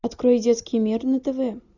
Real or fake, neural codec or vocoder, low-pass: real; none; 7.2 kHz